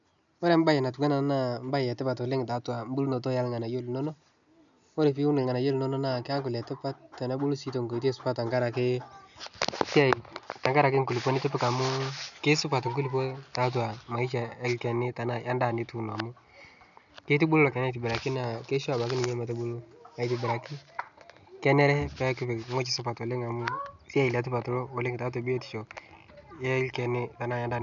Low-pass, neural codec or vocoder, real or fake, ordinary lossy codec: 7.2 kHz; none; real; none